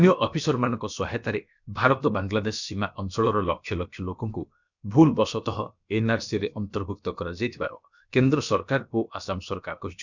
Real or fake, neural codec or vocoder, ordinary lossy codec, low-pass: fake; codec, 16 kHz, about 1 kbps, DyCAST, with the encoder's durations; none; 7.2 kHz